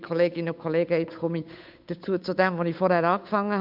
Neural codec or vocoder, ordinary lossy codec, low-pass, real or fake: codec, 16 kHz, 8 kbps, FunCodec, trained on Chinese and English, 25 frames a second; MP3, 48 kbps; 5.4 kHz; fake